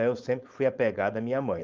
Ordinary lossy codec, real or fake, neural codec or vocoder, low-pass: Opus, 32 kbps; fake; codec, 16 kHz, 4.8 kbps, FACodec; 7.2 kHz